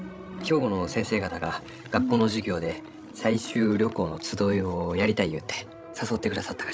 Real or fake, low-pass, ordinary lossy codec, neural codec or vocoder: fake; none; none; codec, 16 kHz, 16 kbps, FreqCodec, larger model